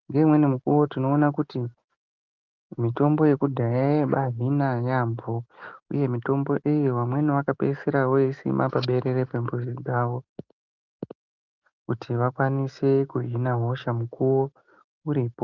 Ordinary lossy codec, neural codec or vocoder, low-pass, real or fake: Opus, 32 kbps; none; 7.2 kHz; real